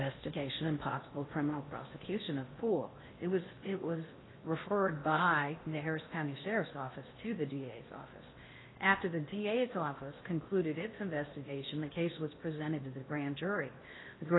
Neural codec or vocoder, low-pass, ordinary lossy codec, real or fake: codec, 16 kHz in and 24 kHz out, 0.6 kbps, FocalCodec, streaming, 4096 codes; 7.2 kHz; AAC, 16 kbps; fake